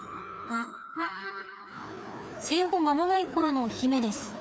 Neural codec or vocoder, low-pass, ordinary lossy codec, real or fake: codec, 16 kHz, 2 kbps, FreqCodec, larger model; none; none; fake